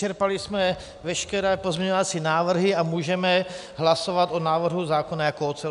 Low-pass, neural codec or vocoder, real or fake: 10.8 kHz; none; real